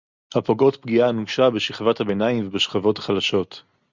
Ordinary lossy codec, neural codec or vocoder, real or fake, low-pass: Opus, 64 kbps; none; real; 7.2 kHz